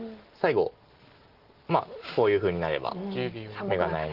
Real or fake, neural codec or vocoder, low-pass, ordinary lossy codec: real; none; 5.4 kHz; Opus, 16 kbps